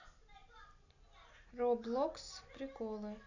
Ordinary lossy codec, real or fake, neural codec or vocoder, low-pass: none; real; none; 7.2 kHz